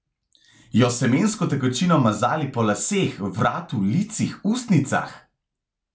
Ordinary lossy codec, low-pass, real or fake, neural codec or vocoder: none; none; real; none